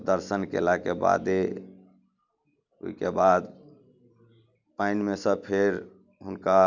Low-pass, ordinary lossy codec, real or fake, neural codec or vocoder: 7.2 kHz; none; real; none